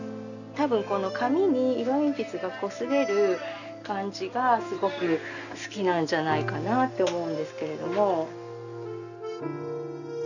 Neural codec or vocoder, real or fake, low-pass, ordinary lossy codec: none; real; 7.2 kHz; none